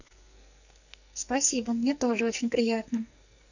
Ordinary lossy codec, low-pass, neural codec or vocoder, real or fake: none; 7.2 kHz; codec, 44.1 kHz, 2.6 kbps, SNAC; fake